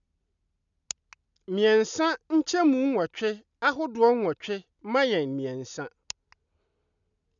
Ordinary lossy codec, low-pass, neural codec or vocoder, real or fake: none; 7.2 kHz; none; real